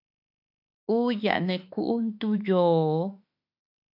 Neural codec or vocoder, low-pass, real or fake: autoencoder, 48 kHz, 32 numbers a frame, DAC-VAE, trained on Japanese speech; 5.4 kHz; fake